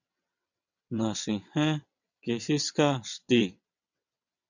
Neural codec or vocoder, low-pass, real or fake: vocoder, 22.05 kHz, 80 mel bands, WaveNeXt; 7.2 kHz; fake